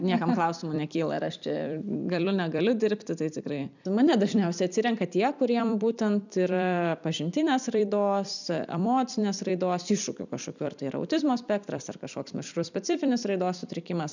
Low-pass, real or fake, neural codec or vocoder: 7.2 kHz; fake; vocoder, 44.1 kHz, 128 mel bands every 256 samples, BigVGAN v2